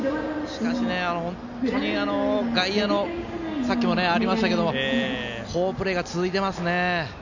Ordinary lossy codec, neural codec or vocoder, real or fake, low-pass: none; none; real; 7.2 kHz